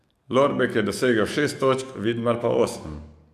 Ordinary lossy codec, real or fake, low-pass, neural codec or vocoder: none; fake; 14.4 kHz; codec, 44.1 kHz, 7.8 kbps, DAC